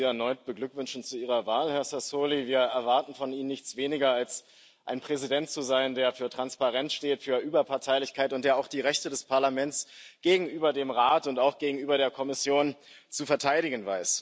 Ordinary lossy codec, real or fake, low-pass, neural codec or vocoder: none; real; none; none